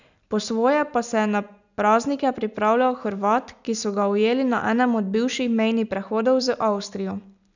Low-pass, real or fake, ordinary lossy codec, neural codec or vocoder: 7.2 kHz; real; none; none